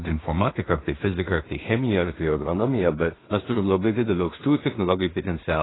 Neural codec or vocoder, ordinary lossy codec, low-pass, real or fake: codec, 16 kHz in and 24 kHz out, 0.4 kbps, LongCat-Audio-Codec, two codebook decoder; AAC, 16 kbps; 7.2 kHz; fake